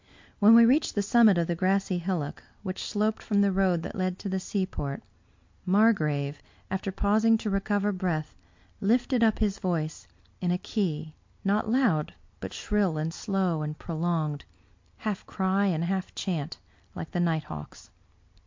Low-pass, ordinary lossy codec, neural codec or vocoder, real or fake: 7.2 kHz; MP3, 48 kbps; none; real